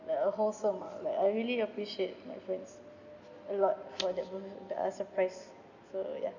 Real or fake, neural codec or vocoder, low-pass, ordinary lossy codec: real; none; 7.2 kHz; none